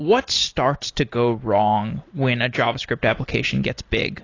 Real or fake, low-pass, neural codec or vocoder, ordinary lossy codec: real; 7.2 kHz; none; AAC, 32 kbps